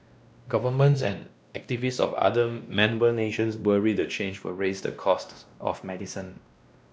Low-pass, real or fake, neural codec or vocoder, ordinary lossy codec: none; fake; codec, 16 kHz, 1 kbps, X-Codec, WavLM features, trained on Multilingual LibriSpeech; none